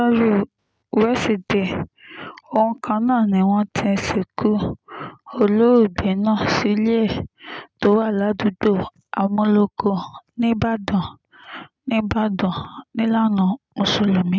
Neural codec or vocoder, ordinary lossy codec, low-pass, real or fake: none; none; none; real